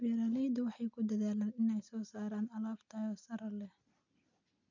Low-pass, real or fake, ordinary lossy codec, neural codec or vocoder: 7.2 kHz; real; none; none